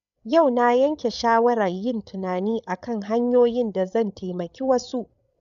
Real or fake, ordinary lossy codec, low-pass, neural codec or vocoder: fake; none; 7.2 kHz; codec, 16 kHz, 8 kbps, FreqCodec, larger model